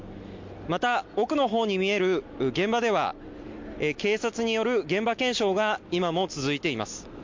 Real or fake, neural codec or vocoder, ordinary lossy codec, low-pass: real; none; MP3, 48 kbps; 7.2 kHz